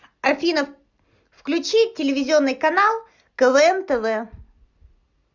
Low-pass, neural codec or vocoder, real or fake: 7.2 kHz; none; real